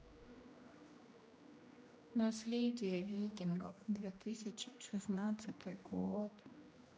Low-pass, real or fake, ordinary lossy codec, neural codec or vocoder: none; fake; none; codec, 16 kHz, 1 kbps, X-Codec, HuBERT features, trained on general audio